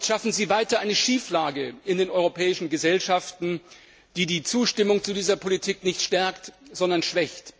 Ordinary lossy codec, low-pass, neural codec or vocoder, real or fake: none; none; none; real